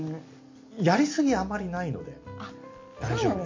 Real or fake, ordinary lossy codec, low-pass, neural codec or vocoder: real; MP3, 48 kbps; 7.2 kHz; none